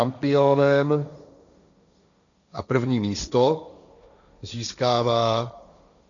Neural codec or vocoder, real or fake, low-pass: codec, 16 kHz, 1.1 kbps, Voila-Tokenizer; fake; 7.2 kHz